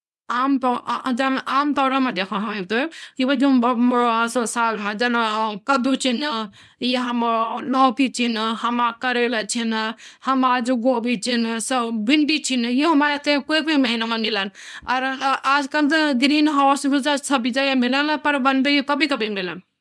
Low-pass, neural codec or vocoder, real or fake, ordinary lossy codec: none; codec, 24 kHz, 0.9 kbps, WavTokenizer, small release; fake; none